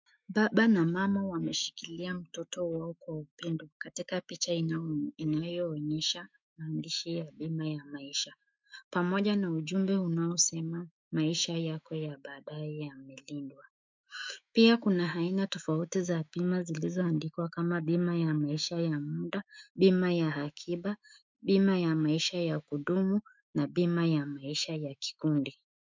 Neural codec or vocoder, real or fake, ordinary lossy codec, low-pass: autoencoder, 48 kHz, 128 numbers a frame, DAC-VAE, trained on Japanese speech; fake; MP3, 64 kbps; 7.2 kHz